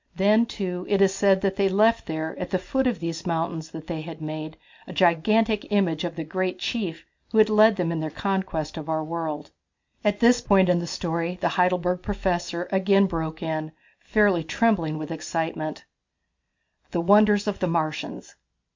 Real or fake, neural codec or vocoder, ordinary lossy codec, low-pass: real; none; MP3, 64 kbps; 7.2 kHz